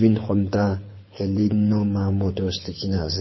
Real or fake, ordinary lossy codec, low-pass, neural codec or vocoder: fake; MP3, 24 kbps; 7.2 kHz; codec, 24 kHz, 6 kbps, HILCodec